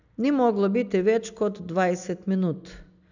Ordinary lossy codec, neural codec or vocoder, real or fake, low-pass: none; none; real; 7.2 kHz